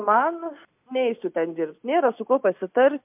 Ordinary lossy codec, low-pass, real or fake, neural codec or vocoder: MP3, 32 kbps; 3.6 kHz; real; none